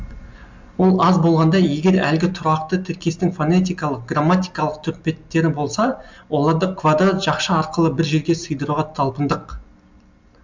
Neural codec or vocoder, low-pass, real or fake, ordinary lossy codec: none; 7.2 kHz; real; MP3, 64 kbps